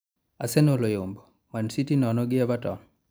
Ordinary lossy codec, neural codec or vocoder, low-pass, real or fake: none; none; none; real